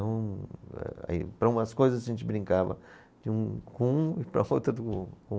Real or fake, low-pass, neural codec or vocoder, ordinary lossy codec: real; none; none; none